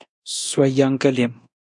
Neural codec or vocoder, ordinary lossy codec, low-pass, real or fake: codec, 24 kHz, 0.9 kbps, DualCodec; MP3, 64 kbps; 10.8 kHz; fake